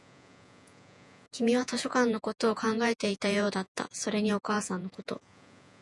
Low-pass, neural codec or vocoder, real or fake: 10.8 kHz; vocoder, 48 kHz, 128 mel bands, Vocos; fake